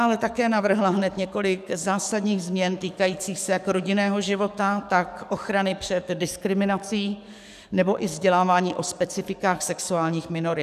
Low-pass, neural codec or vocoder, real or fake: 14.4 kHz; codec, 44.1 kHz, 7.8 kbps, DAC; fake